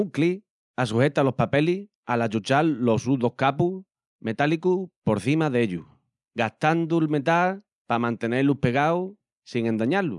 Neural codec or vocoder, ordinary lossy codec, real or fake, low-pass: none; none; real; 10.8 kHz